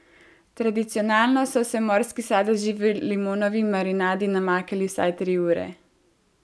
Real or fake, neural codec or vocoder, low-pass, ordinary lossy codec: real; none; none; none